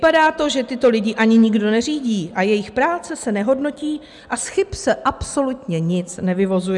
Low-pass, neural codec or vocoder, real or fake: 10.8 kHz; none; real